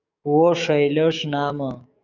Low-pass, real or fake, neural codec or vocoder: 7.2 kHz; fake; codec, 44.1 kHz, 7.8 kbps, DAC